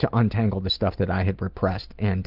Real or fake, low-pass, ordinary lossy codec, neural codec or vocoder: real; 5.4 kHz; Opus, 24 kbps; none